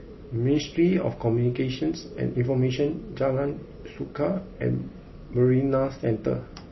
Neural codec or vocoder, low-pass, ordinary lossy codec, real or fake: none; 7.2 kHz; MP3, 24 kbps; real